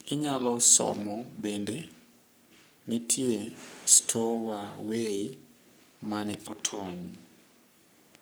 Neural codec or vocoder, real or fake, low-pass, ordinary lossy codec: codec, 44.1 kHz, 3.4 kbps, Pupu-Codec; fake; none; none